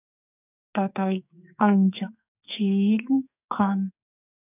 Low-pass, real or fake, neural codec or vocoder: 3.6 kHz; fake; codec, 44.1 kHz, 2.6 kbps, SNAC